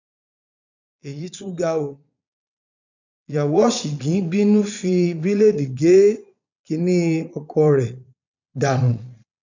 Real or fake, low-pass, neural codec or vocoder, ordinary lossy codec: fake; 7.2 kHz; vocoder, 22.05 kHz, 80 mel bands, Vocos; none